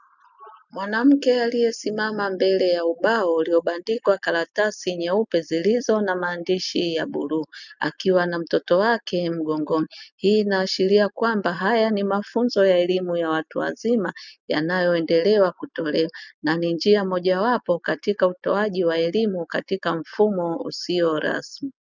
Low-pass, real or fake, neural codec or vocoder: 7.2 kHz; real; none